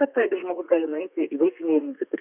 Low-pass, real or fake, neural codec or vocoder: 3.6 kHz; fake; codec, 32 kHz, 1.9 kbps, SNAC